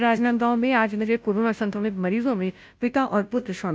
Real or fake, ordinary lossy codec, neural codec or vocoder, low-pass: fake; none; codec, 16 kHz, 0.5 kbps, FunCodec, trained on Chinese and English, 25 frames a second; none